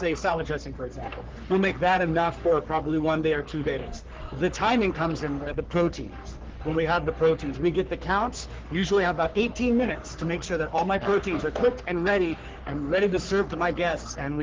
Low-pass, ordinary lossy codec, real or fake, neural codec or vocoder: 7.2 kHz; Opus, 16 kbps; fake; codec, 44.1 kHz, 3.4 kbps, Pupu-Codec